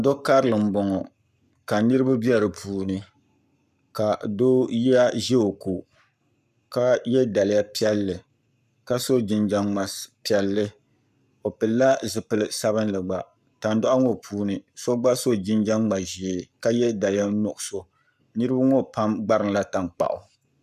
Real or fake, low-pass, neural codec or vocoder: fake; 14.4 kHz; codec, 44.1 kHz, 7.8 kbps, Pupu-Codec